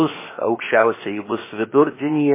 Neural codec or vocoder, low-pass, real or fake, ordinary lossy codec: codec, 16 kHz, 0.7 kbps, FocalCodec; 3.6 kHz; fake; MP3, 16 kbps